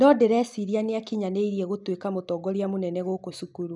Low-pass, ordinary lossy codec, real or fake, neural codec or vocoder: 10.8 kHz; none; real; none